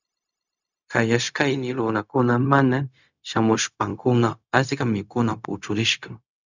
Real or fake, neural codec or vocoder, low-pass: fake; codec, 16 kHz, 0.4 kbps, LongCat-Audio-Codec; 7.2 kHz